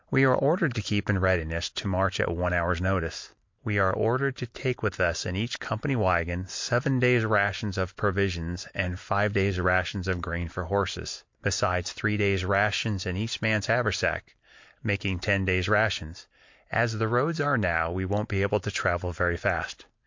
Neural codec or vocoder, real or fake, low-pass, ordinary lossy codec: vocoder, 44.1 kHz, 128 mel bands every 256 samples, BigVGAN v2; fake; 7.2 kHz; MP3, 48 kbps